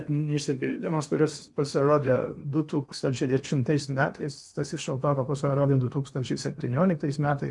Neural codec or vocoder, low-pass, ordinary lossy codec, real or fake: codec, 16 kHz in and 24 kHz out, 0.8 kbps, FocalCodec, streaming, 65536 codes; 10.8 kHz; MP3, 96 kbps; fake